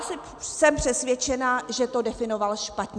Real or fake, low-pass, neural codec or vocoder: real; 9.9 kHz; none